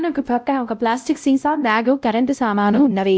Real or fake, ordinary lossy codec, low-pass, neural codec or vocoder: fake; none; none; codec, 16 kHz, 0.5 kbps, X-Codec, WavLM features, trained on Multilingual LibriSpeech